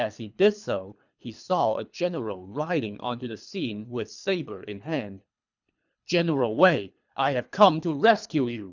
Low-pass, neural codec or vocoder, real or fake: 7.2 kHz; codec, 24 kHz, 3 kbps, HILCodec; fake